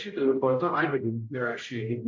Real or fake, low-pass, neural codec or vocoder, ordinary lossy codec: fake; 7.2 kHz; codec, 16 kHz, 0.5 kbps, X-Codec, HuBERT features, trained on general audio; MP3, 48 kbps